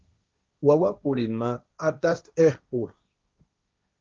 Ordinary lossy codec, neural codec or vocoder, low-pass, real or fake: Opus, 24 kbps; codec, 16 kHz, 1.1 kbps, Voila-Tokenizer; 7.2 kHz; fake